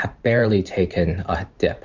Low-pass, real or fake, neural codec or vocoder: 7.2 kHz; fake; vocoder, 44.1 kHz, 128 mel bands every 512 samples, BigVGAN v2